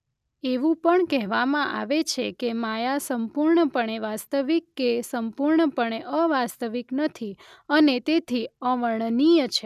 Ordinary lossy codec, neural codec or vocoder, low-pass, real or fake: none; none; 14.4 kHz; real